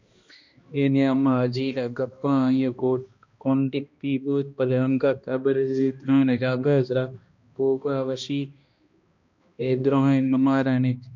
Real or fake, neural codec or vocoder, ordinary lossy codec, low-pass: fake; codec, 16 kHz, 1 kbps, X-Codec, HuBERT features, trained on balanced general audio; MP3, 64 kbps; 7.2 kHz